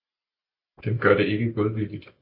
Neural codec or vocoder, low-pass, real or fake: none; 5.4 kHz; real